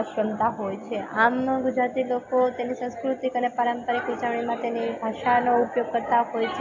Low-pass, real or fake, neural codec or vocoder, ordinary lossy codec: 7.2 kHz; real; none; AAC, 48 kbps